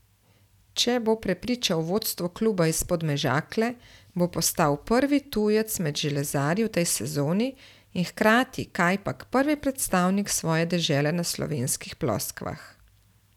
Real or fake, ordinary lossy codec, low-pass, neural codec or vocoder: real; none; 19.8 kHz; none